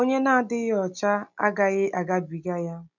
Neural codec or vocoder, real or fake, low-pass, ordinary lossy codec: none; real; 7.2 kHz; none